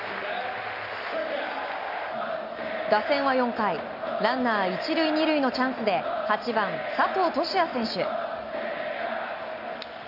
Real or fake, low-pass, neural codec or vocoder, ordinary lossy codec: real; 5.4 kHz; none; none